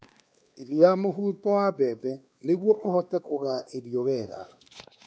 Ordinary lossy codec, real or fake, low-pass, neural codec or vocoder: none; fake; none; codec, 16 kHz, 2 kbps, X-Codec, WavLM features, trained on Multilingual LibriSpeech